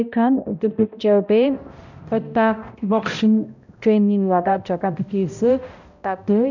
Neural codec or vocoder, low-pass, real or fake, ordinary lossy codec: codec, 16 kHz, 0.5 kbps, X-Codec, HuBERT features, trained on balanced general audio; 7.2 kHz; fake; none